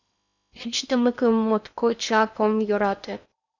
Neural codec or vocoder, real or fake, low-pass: codec, 16 kHz in and 24 kHz out, 0.8 kbps, FocalCodec, streaming, 65536 codes; fake; 7.2 kHz